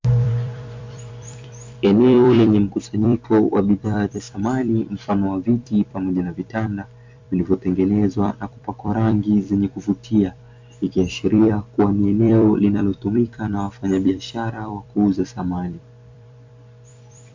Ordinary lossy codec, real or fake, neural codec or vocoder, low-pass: MP3, 64 kbps; fake; vocoder, 44.1 kHz, 128 mel bands every 256 samples, BigVGAN v2; 7.2 kHz